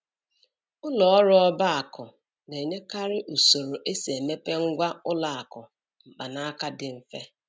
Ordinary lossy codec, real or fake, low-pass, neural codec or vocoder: none; real; none; none